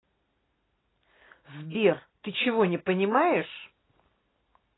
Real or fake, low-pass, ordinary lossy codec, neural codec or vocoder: real; 7.2 kHz; AAC, 16 kbps; none